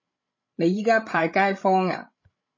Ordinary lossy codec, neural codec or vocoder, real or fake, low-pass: MP3, 32 kbps; codec, 16 kHz, 16 kbps, FreqCodec, larger model; fake; 7.2 kHz